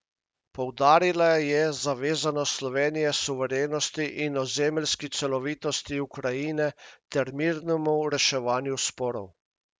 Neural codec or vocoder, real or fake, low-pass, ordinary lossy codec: none; real; none; none